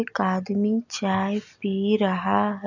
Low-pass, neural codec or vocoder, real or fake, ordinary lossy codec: 7.2 kHz; none; real; none